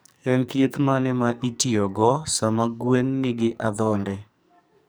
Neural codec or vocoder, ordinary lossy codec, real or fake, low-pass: codec, 44.1 kHz, 2.6 kbps, SNAC; none; fake; none